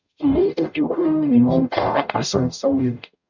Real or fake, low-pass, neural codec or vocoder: fake; 7.2 kHz; codec, 44.1 kHz, 0.9 kbps, DAC